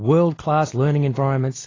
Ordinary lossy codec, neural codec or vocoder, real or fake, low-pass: AAC, 32 kbps; none; real; 7.2 kHz